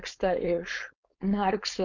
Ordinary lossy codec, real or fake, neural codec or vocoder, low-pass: MP3, 64 kbps; fake; codec, 16 kHz, 4.8 kbps, FACodec; 7.2 kHz